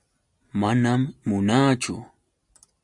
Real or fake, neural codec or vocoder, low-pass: real; none; 10.8 kHz